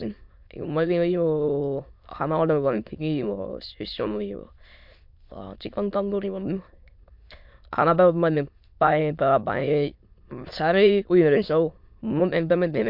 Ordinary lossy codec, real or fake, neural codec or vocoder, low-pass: MP3, 48 kbps; fake; autoencoder, 22.05 kHz, a latent of 192 numbers a frame, VITS, trained on many speakers; 5.4 kHz